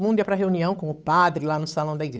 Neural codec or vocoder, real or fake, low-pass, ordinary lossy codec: codec, 16 kHz, 8 kbps, FunCodec, trained on Chinese and English, 25 frames a second; fake; none; none